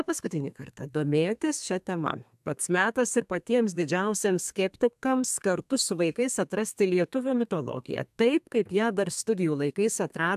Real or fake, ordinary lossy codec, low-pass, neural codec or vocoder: fake; AAC, 96 kbps; 14.4 kHz; codec, 32 kHz, 1.9 kbps, SNAC